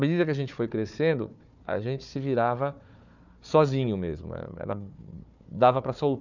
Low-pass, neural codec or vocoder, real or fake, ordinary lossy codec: 7.2 kHz; codec, 16 kHz, 4 kbps, FunCodec, trained on Chinese and English, 50 frames a second; fake; none